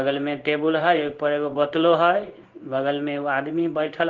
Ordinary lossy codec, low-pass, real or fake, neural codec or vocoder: Opus, 16 kbps; 7.2 kHz; fake; codec, 16 kHz in and 24 kHz out, 1 kbps, XY-Tokenizer